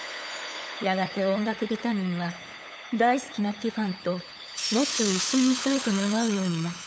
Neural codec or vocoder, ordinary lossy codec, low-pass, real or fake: codec, 16 kHz, 8 kbps, FunCodec, trained on LibriTTS, 25 frames a second; none; none; fake